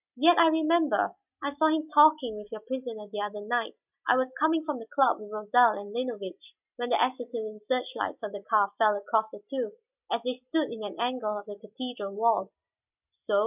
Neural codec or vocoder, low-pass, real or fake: none; 3.6 kHz; real